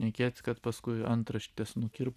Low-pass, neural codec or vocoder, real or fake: 14.4 kHz; none; real